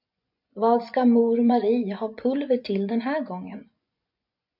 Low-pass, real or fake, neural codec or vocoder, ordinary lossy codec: 5.4 kHz; fake; vocoder, 24 kHz, 100 mel bands, Vocos; MP3, 48 kbps